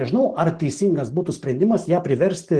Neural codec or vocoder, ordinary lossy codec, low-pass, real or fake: none; Opus, 16 kbps; 10.8 kHz; real